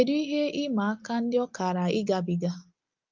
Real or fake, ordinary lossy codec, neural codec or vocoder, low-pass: real; Opus, 32 kbps; none; 7.2 kHz